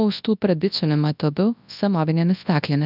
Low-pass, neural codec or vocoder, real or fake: 5.4 kHz; codec, 24 kHz, 0.9 kbps, WavTokenizer, large speech release; fake